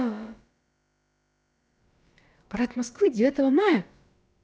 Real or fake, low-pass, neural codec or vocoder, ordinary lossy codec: fake; none; codec, 16 kHz, about 1 kbps, DyCAST, with the encoder's durations; none